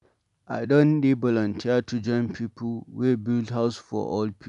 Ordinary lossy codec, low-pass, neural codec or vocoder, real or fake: none; 10.8 kHz; none; real